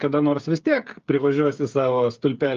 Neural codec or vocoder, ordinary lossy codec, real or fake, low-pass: codec, 16 kHz, 8 kbps, FreqCodec, smaller model; Opus, 32 kbps; fake; 7.2 kHz